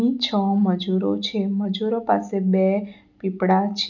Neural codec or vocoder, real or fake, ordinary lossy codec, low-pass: none; real; none; 7.2 kHz